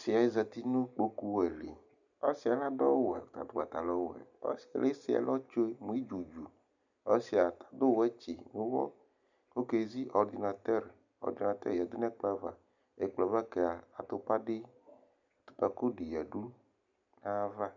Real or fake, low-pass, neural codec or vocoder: real; 7.2 kHz; none